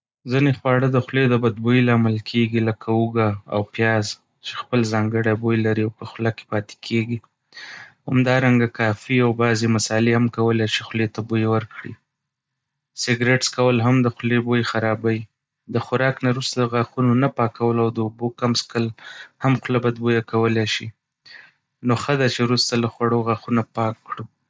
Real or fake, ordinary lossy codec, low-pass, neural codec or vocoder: real; none; none; none